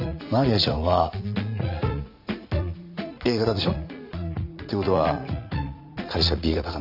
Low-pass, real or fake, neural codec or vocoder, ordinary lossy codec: 5.4 kHz; fake; vocoder, 44.1 kHz, 80 mel bands, Vocos; none